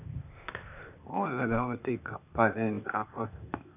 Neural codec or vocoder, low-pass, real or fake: codec, 16 kHz, 0.8 kbps, ZipCodec; 3.6 kHz; fake